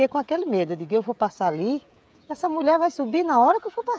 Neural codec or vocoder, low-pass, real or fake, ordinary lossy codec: codec, 16 kHz, 16 kbps, FreqCodec, smaller model; none; fake; none